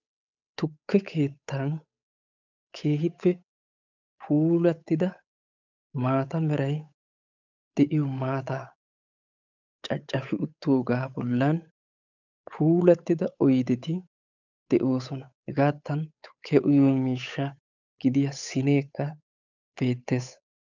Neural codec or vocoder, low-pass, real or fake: codec, 16 kHz, 8 kbps, FunCodec, trained on Chinese and English, 25 frames a second; 7.2 kHz; fake